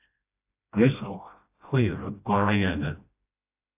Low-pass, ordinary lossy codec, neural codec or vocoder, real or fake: 3.6 kHz; AAC, 32 kbps; codec, 16 kHz, 1 kbps, FreqCodec, smaller model; fake